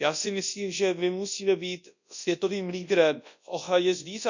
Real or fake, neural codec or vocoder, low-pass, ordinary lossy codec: fake; codec, 24 kHz, 0.9 kbps, WavTokenizer, large speech release; 7.2 kHz; none